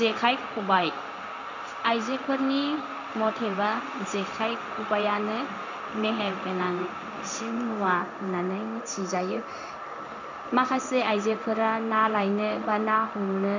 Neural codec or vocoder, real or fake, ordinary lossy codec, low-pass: codec, 16 kHz in and 24 kHz out, 1 kbps, XY-Tokenizer; fake; none; 7.2 kHz